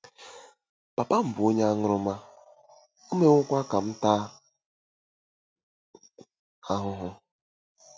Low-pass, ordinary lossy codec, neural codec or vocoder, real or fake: none; none; none; real